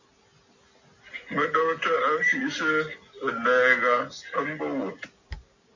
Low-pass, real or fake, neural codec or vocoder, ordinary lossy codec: 7.2 kHz; real; none; AAC, 48 kbps